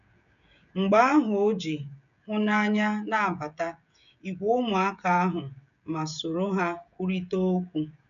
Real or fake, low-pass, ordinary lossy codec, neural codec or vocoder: fake; 7.2 kHz; none; codec, 16 kHz, 16 kbps, FreqCodec, smaller model